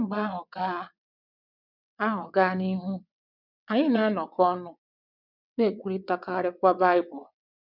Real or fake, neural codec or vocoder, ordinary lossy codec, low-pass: fake; vocoder, 22.05 kHz, 80 mel bands, WaveNeXt; none; 5.4 kHz